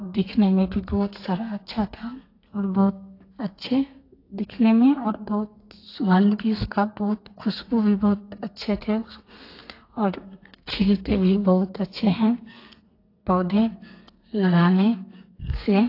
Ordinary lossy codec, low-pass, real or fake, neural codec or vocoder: AAC, 32 kbps; 5.4 kHz; fake; codec, 32 kHz, 1.9 kbps, SNAC